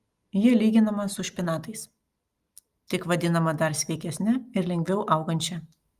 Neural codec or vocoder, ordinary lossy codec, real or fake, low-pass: none; Opus, 32 kbps; real; 14.4 kHz